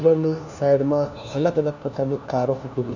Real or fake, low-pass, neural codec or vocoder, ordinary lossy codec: fake; 7.2 kHz; codec, 16 kHz, 1 kbps, FunCodec, trained on LibriTTS, 50 frames a second; none